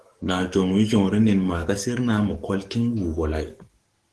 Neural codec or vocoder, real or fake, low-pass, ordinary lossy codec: codec, 44.1 kHz, 7.8 kbps, DAC; fake; 10.8 kHz; Opus, 16 kbps